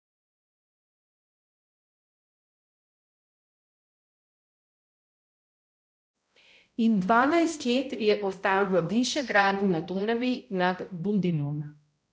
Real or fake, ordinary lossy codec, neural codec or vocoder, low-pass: fake; none; codec, 16 kHz, 0.5 kbps, X-Codec, HuBERT features, trained on balanced general audio; none